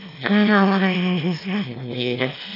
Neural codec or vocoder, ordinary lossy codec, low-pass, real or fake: autoencoder, 22.05 kHz, a latent of 192 numbers a frame, VITS, trained on one speaker; none; 5.4 kHz; fake